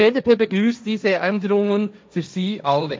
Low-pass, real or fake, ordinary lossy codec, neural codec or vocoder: none; fake; none; codec, 16 kHz, 1.1 kbps, Voila-Tokenizer